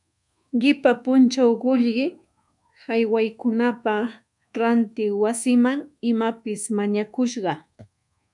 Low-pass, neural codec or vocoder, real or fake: 10.8 kHz; codec, 24 kHz, 1.2 kbps, DualCodec; fake